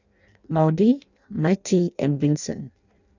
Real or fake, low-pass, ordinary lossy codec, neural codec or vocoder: fake; 7.2 kHz; none; codec, 16 kHz in and 24 kHz out, 0.6 kbps, FireRedTTS-2 codec